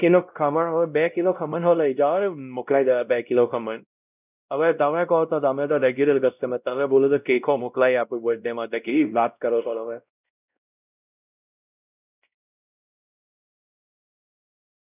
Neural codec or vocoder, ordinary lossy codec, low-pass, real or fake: codec, 16 kHz, 0.5 kbps, X-Codec, WavLM features, trained on Multilingual LibriSpeech; none; 3.6 kHz; fake